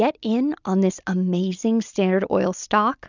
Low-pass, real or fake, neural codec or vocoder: 7.2 kHz; real; none